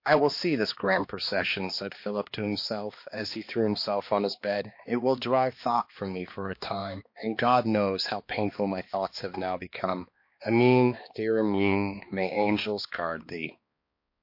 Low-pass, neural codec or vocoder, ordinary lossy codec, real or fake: 5.4 kHz; codec, 16 kHz, 2 kbps, X-Codec, HuBERT features, trained on balanced general audio; MP3, 32 kbps; fake